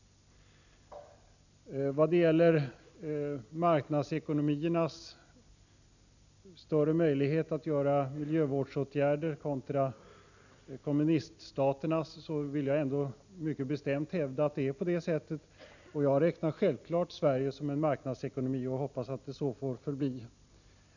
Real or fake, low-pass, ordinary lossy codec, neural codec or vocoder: real; 7.2 kHz; none; none